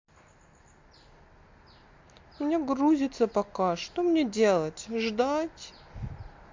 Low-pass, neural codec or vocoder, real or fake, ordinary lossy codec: 7.2 kHz; none; real; MP3, 48 kbps